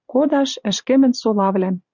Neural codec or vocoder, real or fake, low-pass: vocoder, 44.1 kHz, 128 mel bands every 512 samples, BigVGAN v2; fake; 7.2 kHz